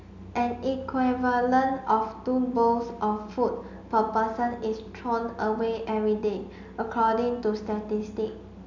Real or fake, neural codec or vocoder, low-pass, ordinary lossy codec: real; none; 7.2 kHz; none